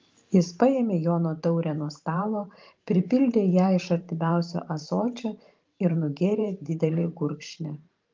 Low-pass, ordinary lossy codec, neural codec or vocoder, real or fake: 7.2 kHz; Opus, 32 kbps; vocoder, 44.1 kHz, 128 mel bands every 512 samples, BigVGAN v2; fake